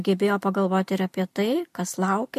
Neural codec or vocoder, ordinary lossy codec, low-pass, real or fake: none; MP3, 64 kbps; 14.4 kHz; real